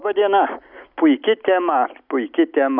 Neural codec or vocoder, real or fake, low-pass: none; real; 5.4 kHz